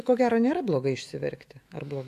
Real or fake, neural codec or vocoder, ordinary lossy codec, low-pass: fake; autoencoder, 48 kHz, 128 numbers a frame, DAC-VAE, trained on Japanese speech; AAC, 64 kbps; 14.4 kHz